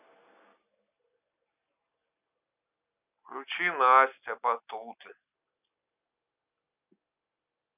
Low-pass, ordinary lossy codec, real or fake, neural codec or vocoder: 3.6 kHz; none; real; none